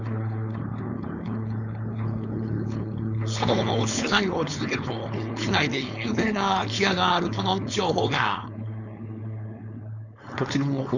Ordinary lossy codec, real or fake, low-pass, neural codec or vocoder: none; fake; 7.2 kHz; codec, 16 kHz, 4.8 kbps, FACodec